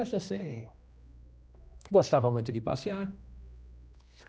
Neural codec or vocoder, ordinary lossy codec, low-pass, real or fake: codec, 16 kHz, 1 kbps, X-Codec, HuBERT features, trained on general audio; none; none; fake